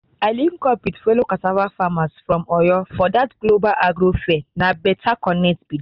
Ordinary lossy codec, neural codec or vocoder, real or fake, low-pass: Opus, 64 kbps; none; real; 5.4 kHz